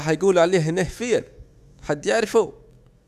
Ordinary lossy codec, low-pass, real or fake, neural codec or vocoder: none; 14.4 kHz; fake; autoencoder, 48 kHz, 128 numbers a frame, DAC-VAE, trained on Japanese speech